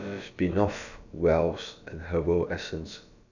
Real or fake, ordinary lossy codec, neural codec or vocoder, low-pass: fake; none; codec, 16 kHz, about 1 kbps, DyCAST, with the encoder's durations; 7.2 kHz